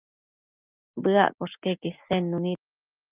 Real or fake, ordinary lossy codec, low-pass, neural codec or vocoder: real; Opus, 32 kbps; 3.6 kHz; none